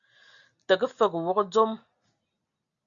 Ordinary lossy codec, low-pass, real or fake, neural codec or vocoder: Opus, 64 kbps; 7.2 kHz; real; none